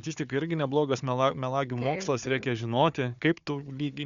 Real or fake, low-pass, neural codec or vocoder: fake; 7.2 kHz; codec, 16 kHz, 4 kbps, FunCodec, trained on Chinese and English, 50 frames a second